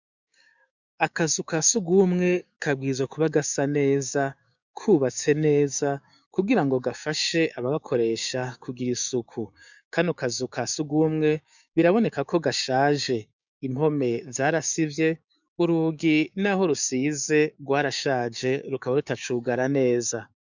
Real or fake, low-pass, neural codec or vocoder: fake; 7.2 kHz; codec, 16 kHz, 6 kbps, DAC